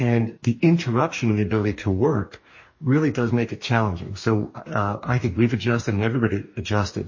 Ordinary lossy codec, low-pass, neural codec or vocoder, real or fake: MP3, 32 kbps; 7.2 kHz; codec, 44.1 kHz, 2.6 kbps, DAC; fake